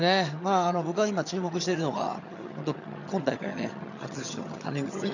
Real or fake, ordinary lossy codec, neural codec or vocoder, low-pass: fake; none; vocoder, 22.05 kHz, 80 mel bands, HiFi-GAN; 7.2 kHz